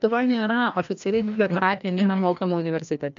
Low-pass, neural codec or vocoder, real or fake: 7.2 kHz; codec, 16 kHz, 1 kbps, FreqCodec, larger model; fake